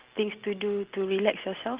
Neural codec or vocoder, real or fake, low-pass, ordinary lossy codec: none; real; 3.6 kHz; Opus, 16 kbps